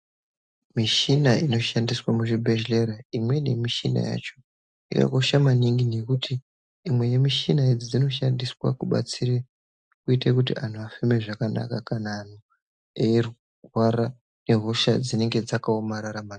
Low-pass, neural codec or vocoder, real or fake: 9.9 kHz; none; real